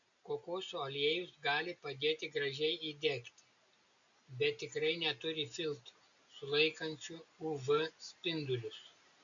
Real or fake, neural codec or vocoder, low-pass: real; none; 7.2 kHz